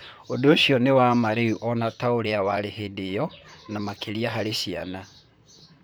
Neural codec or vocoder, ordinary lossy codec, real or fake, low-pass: vocoder, 44.1 kHz, 128 mel bands, Pupu-Vocoder; none; fake; none